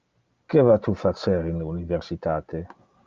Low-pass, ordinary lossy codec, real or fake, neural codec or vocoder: 7.2 kHz; Opus, 24 kbps; real; none